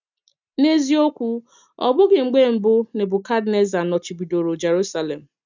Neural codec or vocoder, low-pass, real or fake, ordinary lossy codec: none; 7.2 kHz; real; none